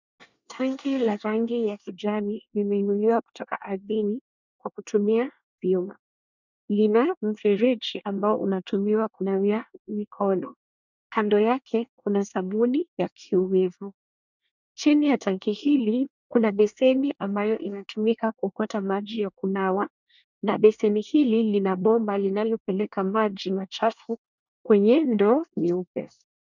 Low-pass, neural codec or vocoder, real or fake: 7.2 kHz; codec, 24 kHz, 1 kbps, SNAC; fake